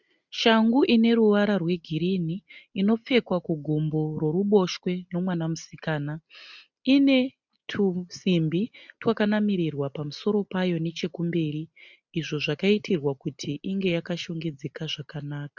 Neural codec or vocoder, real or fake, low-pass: none; real; 7.2 kHz